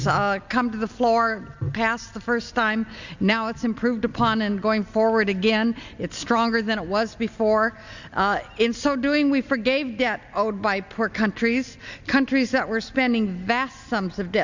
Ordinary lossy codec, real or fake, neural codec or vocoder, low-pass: Opus, 64 kbps; real; none; 7.2 kHz